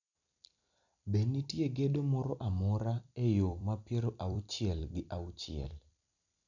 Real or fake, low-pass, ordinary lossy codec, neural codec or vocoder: fake; 7.2 kHz; none; vocoder, 44.1 kHz, 128 mel bands every 256 samples, BigVGAN v2